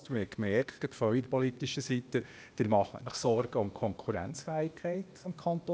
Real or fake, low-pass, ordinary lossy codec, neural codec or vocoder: fake; none; none; codec, 16 kHz, 0.8 kbps, ZipCodec